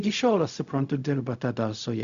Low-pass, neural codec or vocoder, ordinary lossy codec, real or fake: 7.2 kHz; codec, 16 kHz, 0.4 kbps, LongCat-Audio-Codec; Opus, 64 kbps; fake